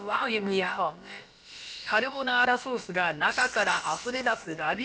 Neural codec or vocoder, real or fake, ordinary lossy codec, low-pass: codec, 16 kHz, about 1 kbps, DyCAST, with the encoder's durations; fake; none; none